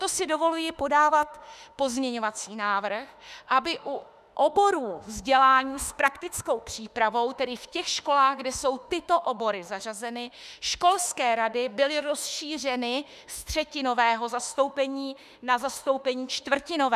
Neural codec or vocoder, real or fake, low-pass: autoencoder, 48 kHz, 32 numbers a frame, DAC-VAE, trained on Japanese speech; fake; 14.4 kHz